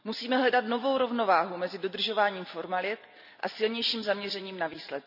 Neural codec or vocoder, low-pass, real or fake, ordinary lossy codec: none; 5.4 kHz; real; none